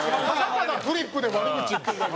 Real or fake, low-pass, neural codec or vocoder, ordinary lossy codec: real; none; none; none